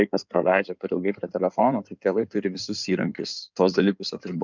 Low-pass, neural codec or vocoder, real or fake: 7.2 kHz; codec, 16 kHz in and 24 kHz out, 2.2 kbps, FireRedTTS-2 codec; fake